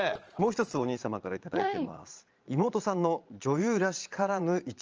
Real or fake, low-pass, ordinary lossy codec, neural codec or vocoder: fake; 7.2 kHz; Opus, 24 kbps; vocoder, 22.05 kHz, 80 mel bands, Vocos